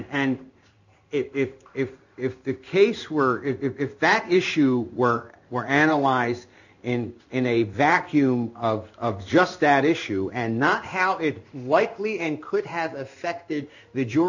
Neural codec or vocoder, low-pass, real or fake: codec, 16 kHz in and 24 kHz out, 1 kbps, XY-Tokenizer; 7.2 kHz; fake